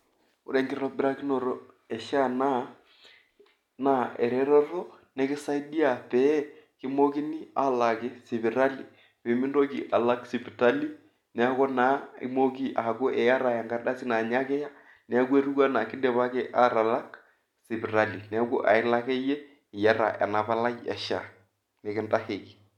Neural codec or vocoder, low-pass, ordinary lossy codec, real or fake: none; 19.8 kHz; none; real